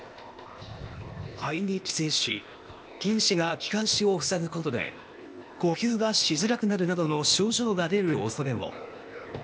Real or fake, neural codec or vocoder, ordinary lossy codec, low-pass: fake; codec, 16 kHz, 0.8 kbps, ZipCodec; none; none